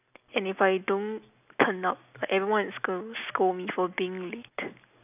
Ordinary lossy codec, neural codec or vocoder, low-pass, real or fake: none; none; 3.6 kHz; real